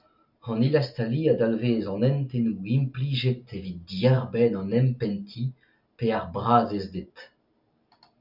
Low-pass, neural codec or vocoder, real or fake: 5.4 kHz; none; real